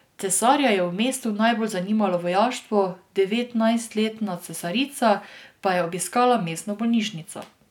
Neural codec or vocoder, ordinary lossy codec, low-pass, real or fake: none; none; 19.8 kHz; real